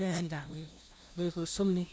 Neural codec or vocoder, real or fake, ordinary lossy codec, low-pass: codec, 16 kHz, 0.5 kbps, FunCodec, trained on LibriTTS, 25 frames a second; fake; none; none